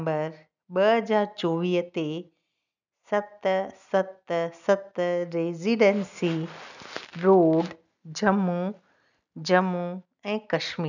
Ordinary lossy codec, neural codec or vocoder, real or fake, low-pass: none; none; real; 7.2 kHz